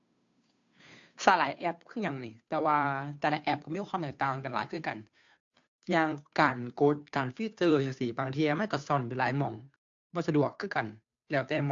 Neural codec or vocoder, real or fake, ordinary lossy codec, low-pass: codec, 16 kHz, 2 kbps, FunCodec, trained on Chinese and English, 25 frames a second; fake; AAC, 64 kbps; 7.2 kHz